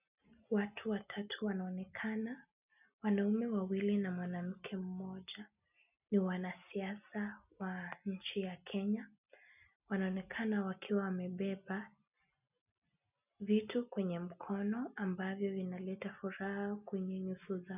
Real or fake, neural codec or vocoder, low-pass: real; none; 3.6 kHz